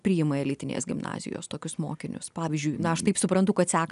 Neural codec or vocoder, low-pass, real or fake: none; 10.8 kHz; real